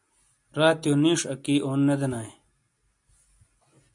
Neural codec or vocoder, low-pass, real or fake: none; 10.8 kHz; real